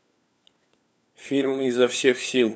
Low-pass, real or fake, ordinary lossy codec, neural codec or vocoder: none; fake; none; codec, 16 kHz, 4 kbps, FunCodec, trained on LibriTTS, 50 frames a second